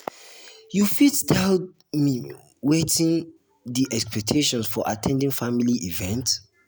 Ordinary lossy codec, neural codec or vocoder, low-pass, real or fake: none; none; none; real